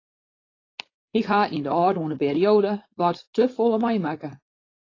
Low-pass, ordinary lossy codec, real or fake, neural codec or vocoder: 7.2 kHz; AAC, 32 kbps; fake; codec, 16 kHz, 4.8 kbps, FACodec